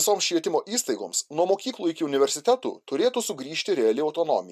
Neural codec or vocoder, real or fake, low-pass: none; real; 14.4 kHz